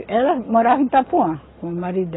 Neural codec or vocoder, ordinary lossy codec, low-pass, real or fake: none; AAC, 16 kbps; 7.2 kHz; real